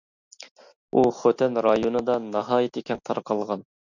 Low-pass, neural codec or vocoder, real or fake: 7.2 kHz; none; real